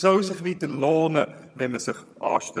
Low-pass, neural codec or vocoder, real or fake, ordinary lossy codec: none; vocoder, 22.05 kHz, 80 mel bands, HiFi-GAN; fake; none